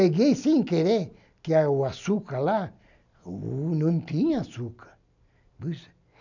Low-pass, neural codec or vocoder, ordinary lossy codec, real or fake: 7.2 kHz; none; none; real